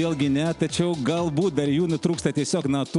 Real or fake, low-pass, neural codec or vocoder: real; 10.8 kHz; none